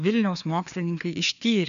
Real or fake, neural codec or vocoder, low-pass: fake; codec, 16 kHz, 2 kbps, FreqCodec, larger model; 7.2 kHz